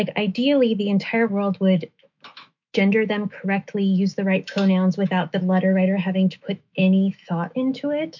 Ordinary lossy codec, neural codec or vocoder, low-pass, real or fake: MP3, 64 kbps; autoencoder, 48 kHz, 128 numbers a frame, DAC-VAE, trained on Japanese speech; 7.2 kHz; fake